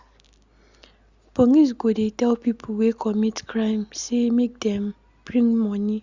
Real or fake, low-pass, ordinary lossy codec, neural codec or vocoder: real; 7.2 kHz; Opus, 64 kbps; none